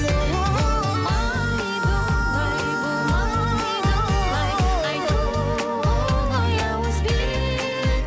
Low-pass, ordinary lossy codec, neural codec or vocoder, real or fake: none; none; none; real